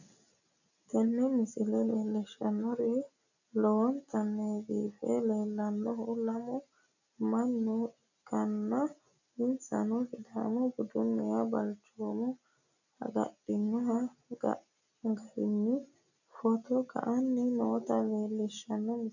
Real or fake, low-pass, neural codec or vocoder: real; 7.2 kHz; none